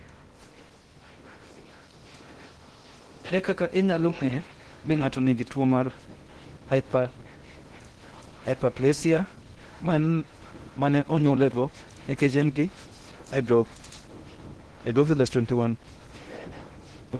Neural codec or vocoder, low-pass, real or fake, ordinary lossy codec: codec, 16 kHz in and 24 kHz out, 0.6 kbps, FocalCodec, streaming, 2048 codes; 10.8 kHz; fake; Opus, 16 kbps